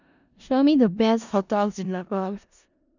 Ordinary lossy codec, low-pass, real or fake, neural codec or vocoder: none; 7.2 kHz; fake; codec, 16 kHz in and 24 kHz out, 0.4 kbps, LongCat-Audio-Codec, four codebook decoder